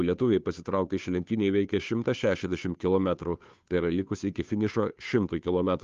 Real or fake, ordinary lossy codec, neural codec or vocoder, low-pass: fake; Opus, 32 kbps; codec, 16 kHz, 2 kbps, FunCodec, trained on Chinese and English, 25 frames a second; 7.2 kHz